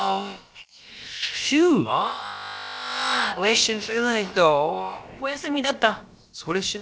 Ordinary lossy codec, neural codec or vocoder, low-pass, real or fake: none; codec, 16 kHz, about 1 kbps, DyCAST, with the encoder's durations; none; fake